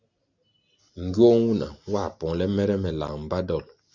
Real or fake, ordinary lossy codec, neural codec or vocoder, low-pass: real; Opus, 64 kbps; none; 7.2 kHz